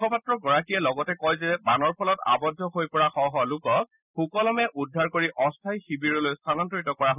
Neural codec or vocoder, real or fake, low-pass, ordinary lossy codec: none; real; 3.6 kHz; none